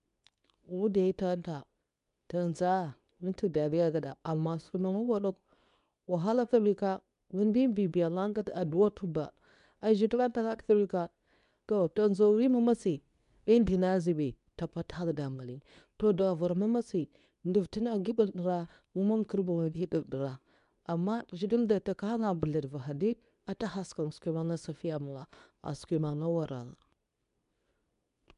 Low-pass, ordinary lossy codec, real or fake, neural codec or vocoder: 10.8 kHz; none; fake; codec, 24 kHz, 0.9 kbps, WavTokenizer, medium speech release version 2